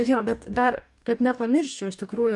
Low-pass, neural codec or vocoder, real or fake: 10.8 kHz; codec, 44.1 kHz, 2.6 kbps, DAC; fake